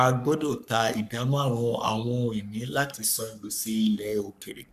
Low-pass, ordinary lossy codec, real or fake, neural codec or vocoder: 14.4 kHz; none; fake; codec, 44.1 kHz, 3.4 kbps, Pupu-Codec